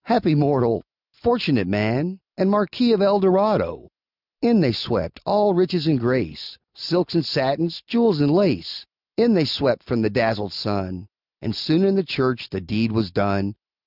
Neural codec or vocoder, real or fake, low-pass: none; real; 5.4 kHz